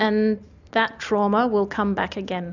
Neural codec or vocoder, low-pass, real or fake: none; 7.2 kHz; real